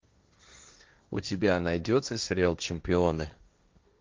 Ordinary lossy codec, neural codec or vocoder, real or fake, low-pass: Opus, 24 kbps; codec, 16 kHz, 1.1 kbps, Voila-Tokenizer; fake; 7.2 kHz